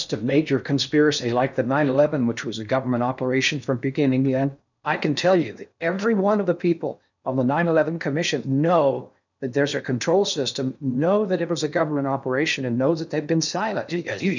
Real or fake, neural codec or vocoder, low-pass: fake; codec, 16 kHz in and 24 kHz out, 0.6 kbps, FocalCodec, streaming, 4096 codes; 7.2 kHz